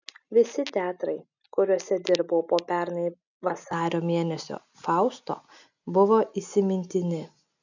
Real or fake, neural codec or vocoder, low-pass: real; none; 7.2 kHz